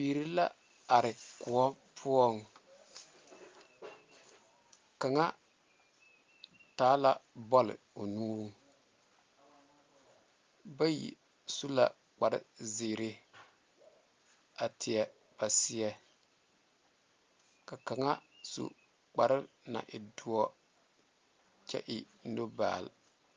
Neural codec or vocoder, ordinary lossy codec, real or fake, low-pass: none; Opus, 32 kbps; real; 7.2 kHz